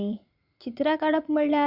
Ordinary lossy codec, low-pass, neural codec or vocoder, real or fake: none; 5.4 kHz; vocoder, 44.1 kHz, 80 mel bands, Vocos; fake